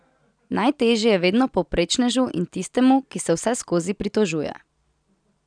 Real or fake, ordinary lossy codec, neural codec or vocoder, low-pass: real; none; none; 9.9 kHz